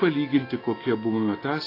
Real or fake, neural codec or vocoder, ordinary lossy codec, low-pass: real; none; AAC, 24 kbps; 5.4 kHz